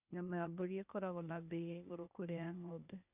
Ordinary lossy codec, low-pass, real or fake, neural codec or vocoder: none; 3.6 kHz; fake; codec, 16 kHz, 0.8 kbps, ZipCodec